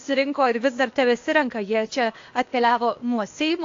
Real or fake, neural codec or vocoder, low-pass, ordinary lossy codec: fake; codec, 16 kHz, 0.8 kbps, ZipCodec; 7.2 kHz; AAC, 48 kbps